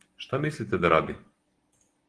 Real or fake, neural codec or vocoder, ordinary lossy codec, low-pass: real; none; Opus, 16 kbps; 10.8 kHz